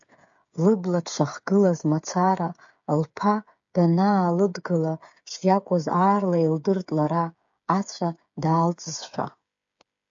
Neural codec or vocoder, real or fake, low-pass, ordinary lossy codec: codec, 16 kHz, 8 kbps, FreqCodec, smaller model; fake; 7.2 kHz; AAC, 64 kbps